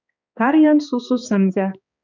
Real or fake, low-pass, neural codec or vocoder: fake; 7.2 kHz; codec, 16 kHz, 2 kbps, X-Codec, HuBERT features, trained on balanced general audio